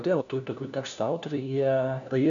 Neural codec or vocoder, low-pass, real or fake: codec, 16 kHz, 1 kbps, FunCodec, trained on LibriTTS, 50 frames a second; 7.2 kHz; fake